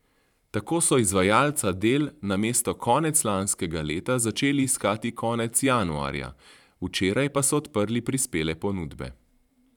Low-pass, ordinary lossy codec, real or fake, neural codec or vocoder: 19.8 kHz; none; fake; vocoder, 44.1 kHz, 128 mel bands every 512 samples, BigVGAN v2